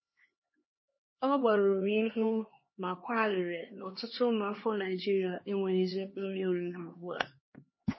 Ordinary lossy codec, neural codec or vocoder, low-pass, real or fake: MP3, 24 kbps; codec, 16 kHz, 2 kbps, X-Codec, HuBERT features, trained on LibriSpeech; 7.2 kHz; fake